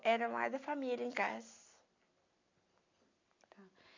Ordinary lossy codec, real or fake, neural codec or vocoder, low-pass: AAC, 48 kbps; real; none; 7.2 kHz